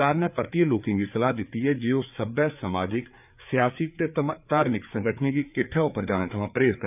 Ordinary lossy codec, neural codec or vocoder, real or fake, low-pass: none; codec, 16 kHz, 4 kbps, FreqCodec, larger model; fake; 3.6 kHz